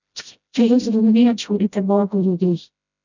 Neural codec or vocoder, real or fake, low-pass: codec, 16 kHz, 0.5 kbps, FreqCodec, smaller model; fake; 7.2 kHz